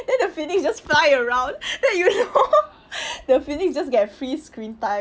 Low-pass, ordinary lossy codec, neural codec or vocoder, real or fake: none; none; none; real